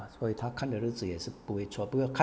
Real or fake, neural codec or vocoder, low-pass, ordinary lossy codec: real; none; none; none